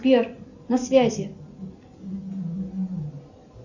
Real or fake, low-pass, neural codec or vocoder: real; 7.2 kHz; none